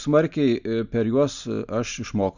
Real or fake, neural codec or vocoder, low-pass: real; none; 7.2 kHz